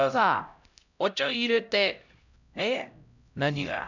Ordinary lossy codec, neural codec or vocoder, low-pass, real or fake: none; codec, 16 kHz, 0.5 kbps, X-Codec, HuBERT features, trained on LibriSpeech; 7.2 kHz; fake